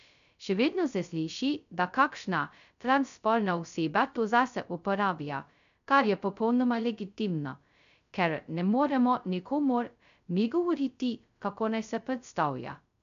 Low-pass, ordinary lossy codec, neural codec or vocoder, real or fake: 7.2 kHz; MP3, 96 kbps; codec, 16 kHz, 0.2 kbps, FocalCodec; fake